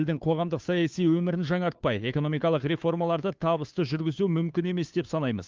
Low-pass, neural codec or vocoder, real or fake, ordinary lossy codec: 7.2 kHz; codec, 16 kHz, 2 kbps, FunCodec, trained on Chinese and English, 25 frames a second; fake; Opus, 32 kbps